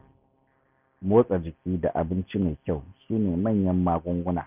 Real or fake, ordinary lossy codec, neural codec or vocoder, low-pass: real; none; none; 3.6 kHz